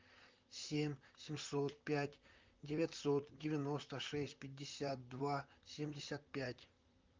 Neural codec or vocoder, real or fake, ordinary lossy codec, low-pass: vocoder, 44.1 kHz, 128 mel bands, Pupu-Vocoder; fake; Opus, 24 kbps; 7.2 kHz